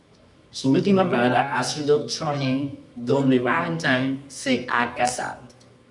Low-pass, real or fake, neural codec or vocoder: 10.8 kHz; fake; codec, 24 kHz, 0.9 kbps, WavTokenizer, medium music audio release